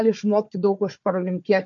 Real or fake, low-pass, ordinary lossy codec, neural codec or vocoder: fake; 7.2 kHz; MP3, 48 kbps; codec, 16 kHz, 4 kbps, FunCodec, trained on Chinese and English, 50 frames a second